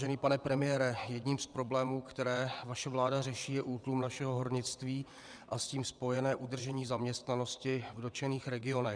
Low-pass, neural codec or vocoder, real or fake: 9.9 kHz; vocoder, 22.05 kHz, 80 mel bands, WaveNeXt; fake